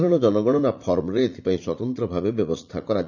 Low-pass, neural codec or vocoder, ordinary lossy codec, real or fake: 7.2 kHz; vocoder, 44.1 kHz, 80 mel bands, Vocos; none; fake